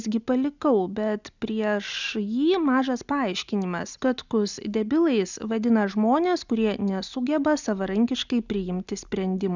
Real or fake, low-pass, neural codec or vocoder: real; 7.2 kHz; none